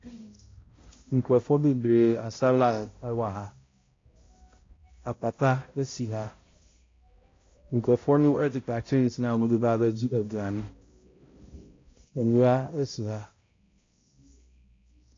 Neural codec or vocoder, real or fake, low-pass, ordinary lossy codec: codec, 16 kHz, 0.5 kbps, X-Codec, HuBERT features, trained on balanced general audio; fake; 7.2 kHz; AAC, 32 kbps